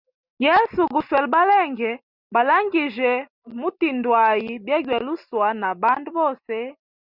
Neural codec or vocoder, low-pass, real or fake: none; 5.4 kHz; real